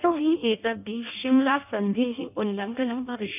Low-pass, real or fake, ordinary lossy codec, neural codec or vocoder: 3.6 kHz; fake; none; codec, 16 kHz in and 24 kHz out, 0.6 kbps, FireRedTTS-2 codec